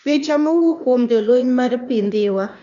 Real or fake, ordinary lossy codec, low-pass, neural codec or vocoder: fake; none; 7.2 kHz; codec, 16 kHz, 0.8 kbps, ZipCodec